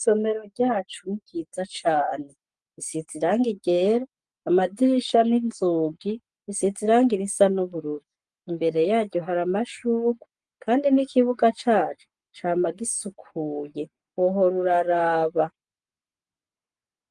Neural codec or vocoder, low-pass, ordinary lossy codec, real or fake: codec, 44.1 kHz, 7.8 kbps, Pupu-Codec; 10.8 kHz; Opus, 32 kbps; fake